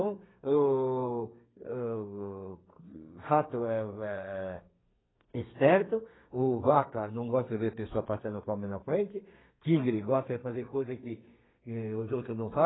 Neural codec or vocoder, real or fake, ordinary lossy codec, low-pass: codec, 32 kHz, 1.9 kbps, SNAC; fake; AAC, 16 kbps; 7.2 kHz